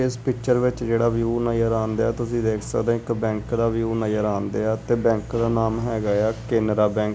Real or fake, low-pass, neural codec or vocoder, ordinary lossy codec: real; none; none; none